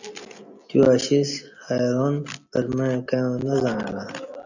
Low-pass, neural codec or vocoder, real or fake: 7.2 kHz; none; real